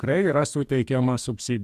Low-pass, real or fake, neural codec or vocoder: 14.4 kHz; fake; codec, 44.1 kHz, 2.6 kbps, DAC